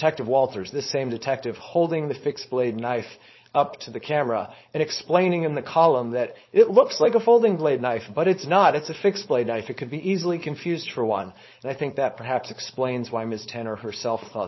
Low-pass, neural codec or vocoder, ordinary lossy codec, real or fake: 7.2 kHz; codec, 16 kHz, 4.8 kbps, FACodec; MP3, 24 kbps; fake